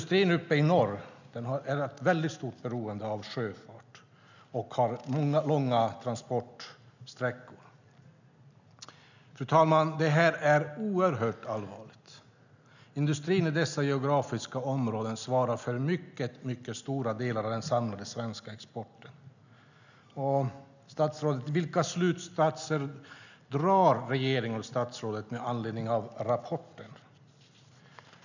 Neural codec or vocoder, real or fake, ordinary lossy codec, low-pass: none; real; none; 7.2 kHz